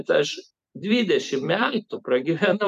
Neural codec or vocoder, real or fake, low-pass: vocoder, 44.1 kHz, 128 mel bands every 256 samples, BigVGAN v2; fake; 14.4 kHz